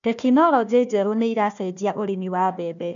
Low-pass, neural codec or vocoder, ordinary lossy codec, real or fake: 7.2 kHz; codec, 16 kHz, 1 kbps, FunCodec, trained on Chinese and English, 50 frames a second; none; fake